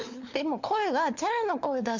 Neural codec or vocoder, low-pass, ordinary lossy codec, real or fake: codec, 16 kHz, 4 kbps, FunCodec, trained on LibriTTS, 50 frames a second; 7.2 kHz; MP3, 64 kbps; fake